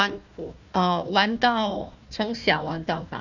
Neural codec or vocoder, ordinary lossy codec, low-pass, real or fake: codec, 16 kHz, 1 kbps, FunCodec, trained on Chinese and English, 50 frames a second; none; 7.2 kHz; fake